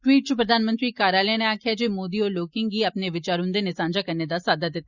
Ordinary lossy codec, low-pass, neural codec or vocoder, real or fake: Opus, 64 kbps; 7.2 kHz; none; real